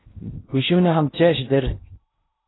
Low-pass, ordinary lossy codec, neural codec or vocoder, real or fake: 7.2 kHz; AAC, 16 kbps; codec, 16 kHz in and 24 kHz out, 0.8 kbps, FocalCodec, streaming, 65536 codes; fake